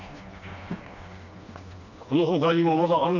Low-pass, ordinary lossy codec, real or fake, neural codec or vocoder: 7.2 kHz; none; fake; codec, 16 kHz, 2 kbps, FreqCodec, smaller model